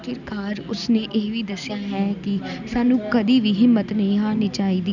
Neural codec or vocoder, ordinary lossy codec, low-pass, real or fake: none; none; 7.2 kHz; real